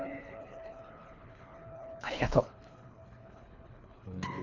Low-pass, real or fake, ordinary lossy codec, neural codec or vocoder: 7.2 kHz; fake; none; codec, 24 kHz, 3 kbps, HILCodec